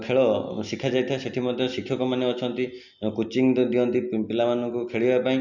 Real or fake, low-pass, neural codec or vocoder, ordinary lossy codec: real; 7.2 kHz; none; none